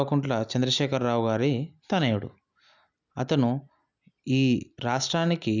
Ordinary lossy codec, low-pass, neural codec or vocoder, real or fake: none; 7.2 kHz; none; real